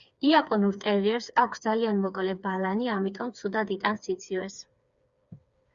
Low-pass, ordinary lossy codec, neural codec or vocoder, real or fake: 7.2 kHz; Opus, 64 kbps; codec, 16 kHz, 4 kbps, FreqCodec, smaller model; fake